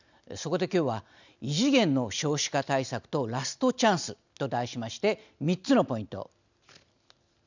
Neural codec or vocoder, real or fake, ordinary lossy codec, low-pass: none; real; none; 7.2 kHz